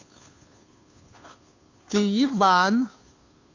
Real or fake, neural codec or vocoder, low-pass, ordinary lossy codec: fake; codec, 16 kHz, 2 kbps, FunCodec, trained on Chinese and English, 25 frames a second; 7.2 kHz; AAC, 48 kbps